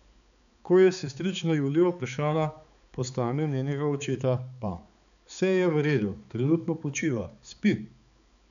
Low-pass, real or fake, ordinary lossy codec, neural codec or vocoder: 7.2 kHz; fake; none; codec, 16 kHz, 4 kbps, X-Codec, HuBERT features, trained on balanced general audio